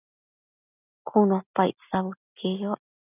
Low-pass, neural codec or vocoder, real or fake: 3.6 kHz; none; real